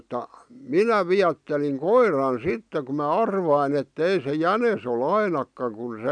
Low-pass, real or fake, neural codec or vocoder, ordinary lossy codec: 9.9 kHz; real; none; none